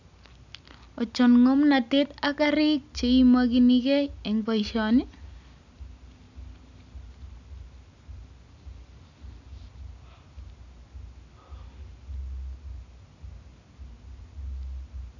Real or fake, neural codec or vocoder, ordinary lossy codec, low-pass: real; none; none; 7.2 kHz